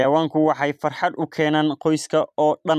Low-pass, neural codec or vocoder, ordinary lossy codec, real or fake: 14.4 kHz; vocoder, 44.1 kHz, 128 mel bands every 256 samples, BigVGAN v2; none; fake